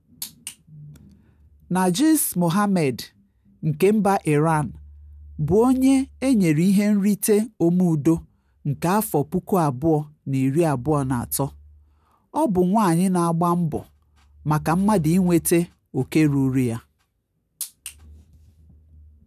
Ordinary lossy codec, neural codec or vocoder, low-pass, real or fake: none; none; 14.4 kHz; real